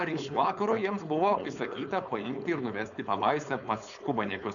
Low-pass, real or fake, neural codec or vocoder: 7.2 kHz; fake; codec, 16 kHz, 4.8 kbps, FACodec